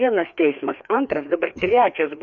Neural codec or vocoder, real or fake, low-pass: codec, 16 kHz, 2 kbps, FreqCodec, larger model; fake; 7.2 kHz